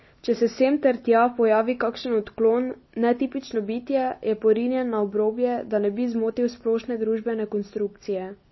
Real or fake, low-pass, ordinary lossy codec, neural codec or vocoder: real; 7.2 kHz; MP3, 24 kbps; none